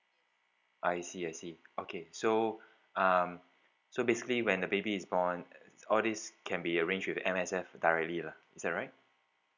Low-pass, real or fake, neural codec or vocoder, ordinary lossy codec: 7.2 kHz; real; none; none